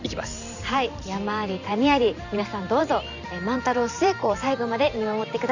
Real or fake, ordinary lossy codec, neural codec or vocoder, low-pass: fake; none; vocoder, 44.1 kHz, 128 mel bands every 512 samples, BigVGAN v2; 7.2 kHz